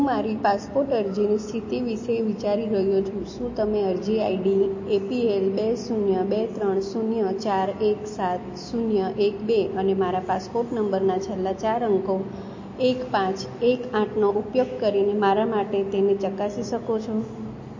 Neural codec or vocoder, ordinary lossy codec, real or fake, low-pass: none; MP3, 32 kbps; real; 7.2 kHz